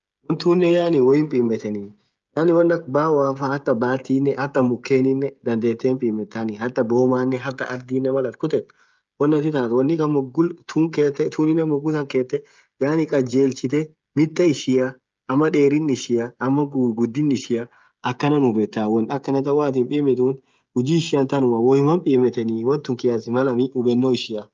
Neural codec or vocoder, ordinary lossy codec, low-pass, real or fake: codec, 16 kHz, 16 kbps, FreqCodec, smaller model; Opus, 24 kbps; 7.2 kHz; fake